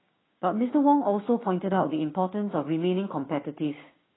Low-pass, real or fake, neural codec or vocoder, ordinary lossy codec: 7.2 kHz; fake; codec, 16 kHz, 6 kbps, DAC; AAC, 16 kbps